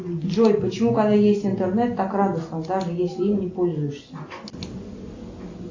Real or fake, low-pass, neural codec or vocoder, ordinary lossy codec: real; 7.2 kHz; none; AAC, 48 kbps